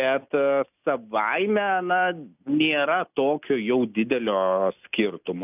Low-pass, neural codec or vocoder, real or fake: 3.6 kHz; none; real